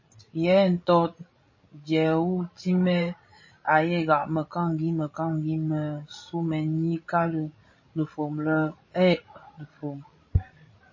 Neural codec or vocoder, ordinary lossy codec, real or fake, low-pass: codec, 16 kHz, 16 kbps, FreqCodec, larger model; MP3, 32 kbps; fake; 7.2 kHz